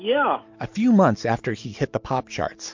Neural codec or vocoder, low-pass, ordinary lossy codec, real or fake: none; 7.2 kHz; MP3, 48 kbps; real